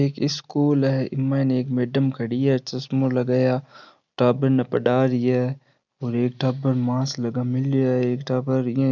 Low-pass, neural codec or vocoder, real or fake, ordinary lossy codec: 7.2 kHz; none; real; none